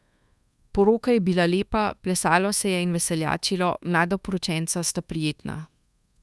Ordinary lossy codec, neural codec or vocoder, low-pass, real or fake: none; codec, 24 kHz, 1.2 kbps, DualCodec; none; fake